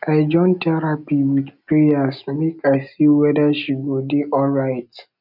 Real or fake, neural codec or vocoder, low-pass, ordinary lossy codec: real; none; 5.4 kHz; none